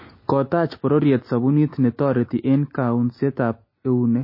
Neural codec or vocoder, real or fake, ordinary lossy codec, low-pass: none; real; MP3, 24 kbps; 5.4 kHz